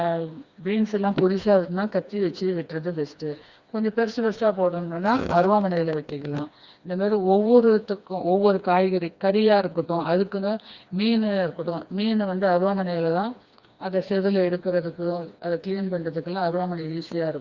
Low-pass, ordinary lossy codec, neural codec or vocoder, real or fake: 7.2 kHz; Opus, 64 kbps; codec, 16 kHz, 2 kbps, FreqCodec, smaller model; fake